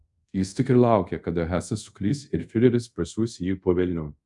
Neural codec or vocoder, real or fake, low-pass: codec, 24 kHz, 0.5 kbps, DualCodec; fake; 10.8 kHz